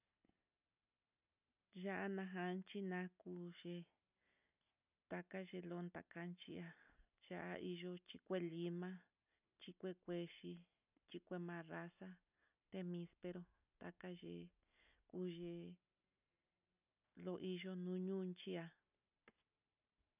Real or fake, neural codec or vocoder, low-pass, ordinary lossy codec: real; none; 3.6 kHz; none